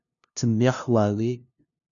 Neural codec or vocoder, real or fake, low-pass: codec, 16 kHz, 0.5 kbps, FunCodec, trained on LibriTTS, 25 frames a second; fake; 7.2 kHz